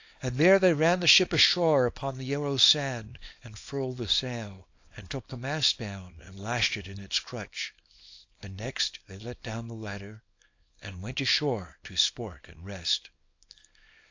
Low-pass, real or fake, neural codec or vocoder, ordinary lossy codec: 7.2 kHz; fake; codec, 24 kHz, 0.9 kbps, WavTokenizer, small release; AAC, 48 kbps